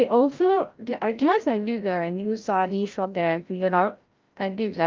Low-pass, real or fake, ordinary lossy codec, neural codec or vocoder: 7.2 kHz; fake; Opus, 24 kbps; codec, 16 kHz, 0.5 kbps, FreqCodec, larger model